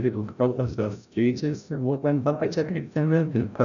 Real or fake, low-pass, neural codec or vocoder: fake; 7.2 kHz; codec, 16 kHz, 0.5 kbps, FreqCodec, larger model